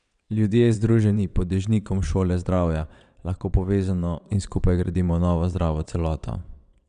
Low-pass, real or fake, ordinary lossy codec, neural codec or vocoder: 9.9 kHz; fake; none; vocoder, 22.05 kHz, 80 mel bands, WaveNeXt